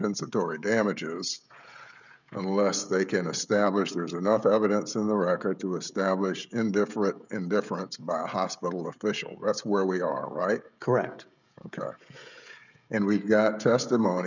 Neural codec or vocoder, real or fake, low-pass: codec, 16 kHz, 16 kbps, FreqCodec, smaller model; fake; 7.2 kHz